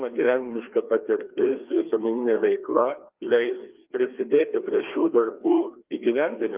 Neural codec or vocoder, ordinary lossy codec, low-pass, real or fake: codec, 16 kHz, 2 kbps, FreqCodec, larger model; Opus, 24 kbps; 3.6 kHz; fake